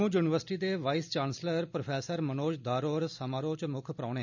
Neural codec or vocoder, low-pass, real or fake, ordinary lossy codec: none; 7.2 kHz; real; none